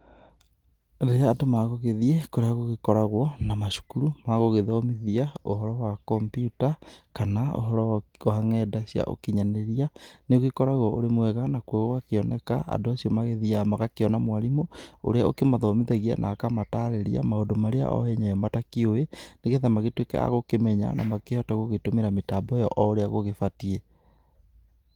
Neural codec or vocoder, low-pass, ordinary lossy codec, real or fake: none; 19.8 kHz; Opus, 24 kbps; real